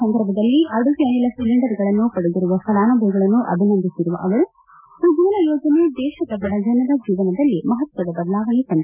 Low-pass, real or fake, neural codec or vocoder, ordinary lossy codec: 3.6 kHz; real; none; none